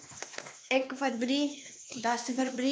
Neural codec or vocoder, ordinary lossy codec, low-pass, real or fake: codec, 16 kHz, 2 kbps, X-Codec, WavLM features, trained on Multilingual LibriSpeech; none; none; fake